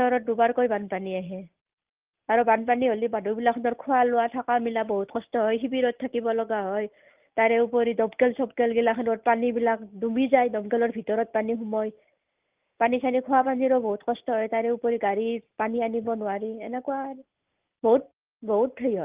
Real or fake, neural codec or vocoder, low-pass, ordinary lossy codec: real; none; 3.6 kHz; Opus, 32 kbps